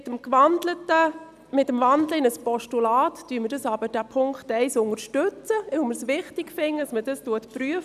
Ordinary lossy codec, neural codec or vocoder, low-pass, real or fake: none; none; 14.4 kHz; real